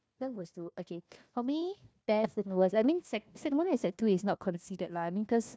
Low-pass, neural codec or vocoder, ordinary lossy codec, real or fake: none; codec, 16 kHz, 1 kbps, FunCodec, trained on Chinese and English, 50 frames a second; none; fake